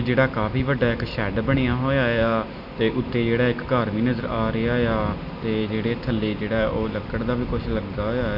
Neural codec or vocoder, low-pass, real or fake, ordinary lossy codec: none; 5.4 kHz; real; none